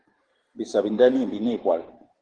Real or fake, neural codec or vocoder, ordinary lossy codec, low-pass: fake; vocoder, 44.1 kHz, 128 mel bands every 512 samples, BigVGAN v2; Opus, 16 kbps; 9.9 kHz